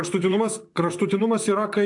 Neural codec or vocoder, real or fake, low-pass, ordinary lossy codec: vocoder, 44.1 kHz, 128 mel bands, Pupu-Vocoder; fake; 10.8 kHz; AAC, 64 kbps